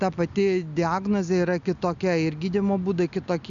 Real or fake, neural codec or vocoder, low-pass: real; none; 7.2 kHz